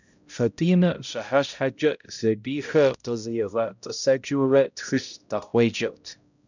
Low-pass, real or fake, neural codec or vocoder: 7.2 kHz; fake; codec, 16 kHz, 0.5 kbps, X-Codec, HuBERT features, trained on balanced general audio